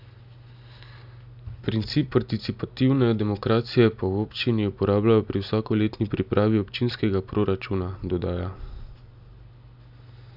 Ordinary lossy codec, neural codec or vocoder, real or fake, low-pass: none; none; real; 5.4 kHz